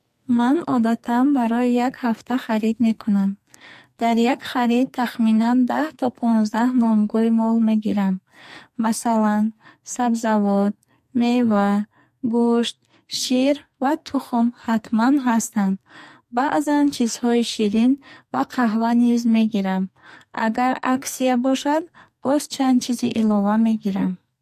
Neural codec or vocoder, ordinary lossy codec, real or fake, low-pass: codec, 44.1 kHz, 2.6 kbps, SNAC; MP3, 64 kbps; fake; 14.4 kHz